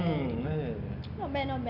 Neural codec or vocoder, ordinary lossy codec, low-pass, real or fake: none; none; 5.4 kHz; real